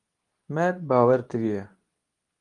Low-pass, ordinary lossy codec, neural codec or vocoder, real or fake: 10.8 kHz; Opus, 32 kbps; codec, 24 kHz, 0.9 kbps, WavTokenizer, medium speech release version 2; fake